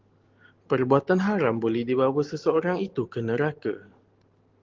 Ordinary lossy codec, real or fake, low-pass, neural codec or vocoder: Opus, 16 kbps; real; 7.2 kHz; none